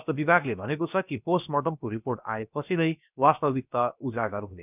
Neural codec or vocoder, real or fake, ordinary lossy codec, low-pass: codec, 16 kHz, about 1 kbps, DyCAST, with the encoder's durations; fake; none; 3.6 kHz